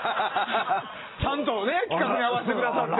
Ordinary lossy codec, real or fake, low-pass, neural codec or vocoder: AAC, 16 kbps; real; 7.2 kHz; none